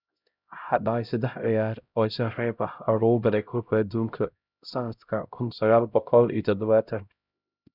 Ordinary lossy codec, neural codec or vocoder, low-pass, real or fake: none; codec, 16 kHz, 0.5 kbps, X-Codec, HuBERT features, trained on LibriSpeech; 5.4 kHz; fake